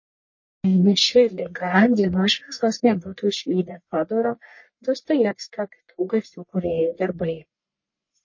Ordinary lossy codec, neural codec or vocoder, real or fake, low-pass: MP3, 32 kbps; codec, 44.1 kHz, 1.7 kbps, Pupu-Codec; fake; 7.2 kHz